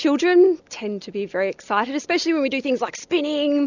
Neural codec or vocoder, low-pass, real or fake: none; 7.2 kHz; real